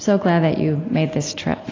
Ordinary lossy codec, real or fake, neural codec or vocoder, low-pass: AAC, 32 kbps; real; none; 7.2 kHz